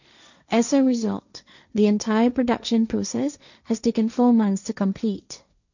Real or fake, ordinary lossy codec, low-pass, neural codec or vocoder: fake; none; 7.2 kHz; codec, 16 kHz, 1.1 kbps, Voila-Tokenizer